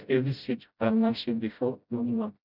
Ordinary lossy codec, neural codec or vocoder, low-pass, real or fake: none; codec, 16 kHz, 0.5 kbps, FreqCodec, smaller model; 5.4 kHz; fake